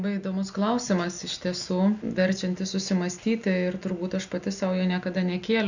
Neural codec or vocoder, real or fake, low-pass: none; real; 7.2 kHz